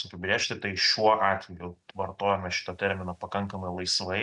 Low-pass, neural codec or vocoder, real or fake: 10.8 kHz; none; real